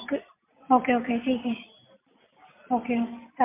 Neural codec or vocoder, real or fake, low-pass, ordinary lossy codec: none; real; 3.6 kHz; MP3, 32 kbps